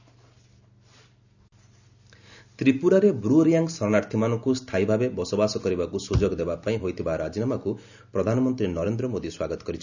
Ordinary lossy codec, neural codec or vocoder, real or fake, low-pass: none; none; real; 7.2 kHz